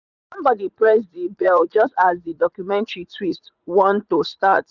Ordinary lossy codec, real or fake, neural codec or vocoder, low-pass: none; fake; vocoder, 44.1 kHz, 80 mel bands, Vocos; 7.2 kHz